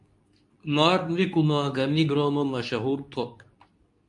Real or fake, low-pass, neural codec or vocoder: fake; 10.8 kHz; codec, 24 kHz, 0.9 kbps, WavTokenizer, medium speech release version 2